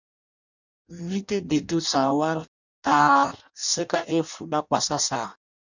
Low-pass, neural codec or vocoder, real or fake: 7.2 kHz; codec, 16 kHz in and 24 kHz out, 0.6 kbps, FireRedTTS-2 codec; fake